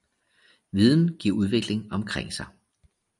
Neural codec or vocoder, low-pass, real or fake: none; 10.8 kHz; real